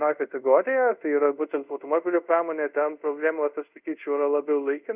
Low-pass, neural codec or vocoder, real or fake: 3.6 kHz; codec, 24 kHz, 0.5 kbps, DualCodec; fake